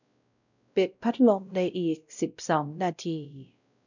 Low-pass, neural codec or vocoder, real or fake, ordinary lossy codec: 7.2 kHz; codec, 16 kHz, 0.5 kbps, X-Codec, WavLM features, trained on Multilingual LibriSpeech; fake; none